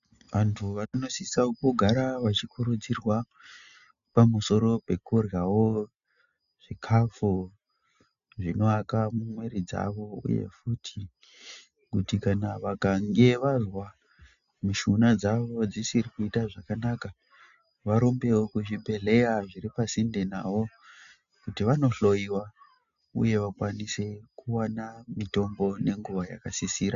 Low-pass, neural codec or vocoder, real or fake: 7.2 kHz; none; real